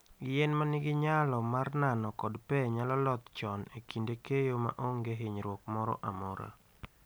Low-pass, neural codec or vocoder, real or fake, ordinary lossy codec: none; none; real; none